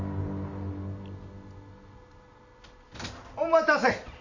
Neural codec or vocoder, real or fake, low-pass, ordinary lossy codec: none; real; 7.2 kHz; AAC, 48 kbps